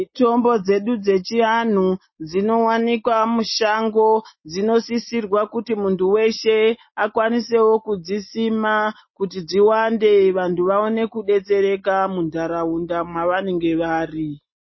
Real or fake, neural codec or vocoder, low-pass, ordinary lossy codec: real; none; 7.2 kHz; MP3, 24 kbps